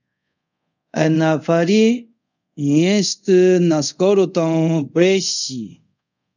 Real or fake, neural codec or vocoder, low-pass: fake; codec, 24 kHz, 0.5 kbps, DualCodec; 7.2 kHz